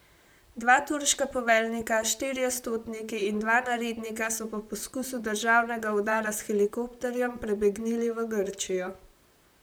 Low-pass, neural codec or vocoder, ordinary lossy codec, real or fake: none; vocoder, 44.1 kHz, 128 mel bands, Pupu-Vocoder; none; fake